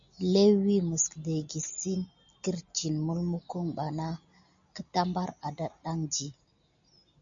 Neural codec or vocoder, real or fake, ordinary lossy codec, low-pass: none; real; MP3, 96 kbps; 7.2 kHz